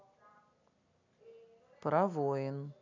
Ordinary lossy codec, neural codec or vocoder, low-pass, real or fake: none; none; 7.2 kHz; real